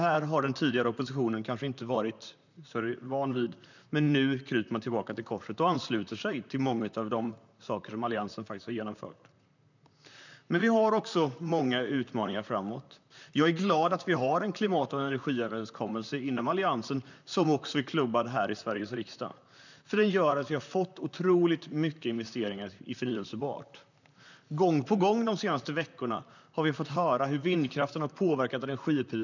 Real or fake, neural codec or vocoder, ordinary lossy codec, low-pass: fake; vocoder, 44.1 kHz, 128 mel bands, Pupu-Vocoder; none; 7.2 kHz